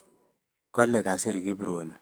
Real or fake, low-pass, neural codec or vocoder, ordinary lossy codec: fake; none; codec, 44.1 kHz, 2.6 kbps, SNAC; none